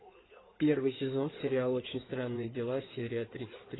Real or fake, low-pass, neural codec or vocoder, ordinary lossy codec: fake; 7.2 kHz; codec, 16 kHz in and 24 kHz out, 2.2 kbps, FireRedTTS-2 codec; AAC, 16 kbps